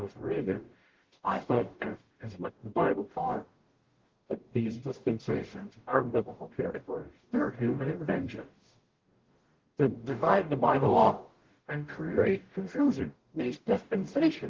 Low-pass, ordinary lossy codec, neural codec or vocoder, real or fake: 7.2 kHz; Opus, 16 kbps; codec, 44.1 kHz, 0.9 kbps, DAC; fake